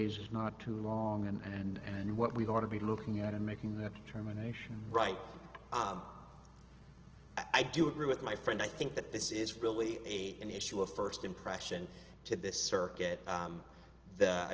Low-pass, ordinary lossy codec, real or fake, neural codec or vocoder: 7.2 kHz; Opus, 16 kbps; real; none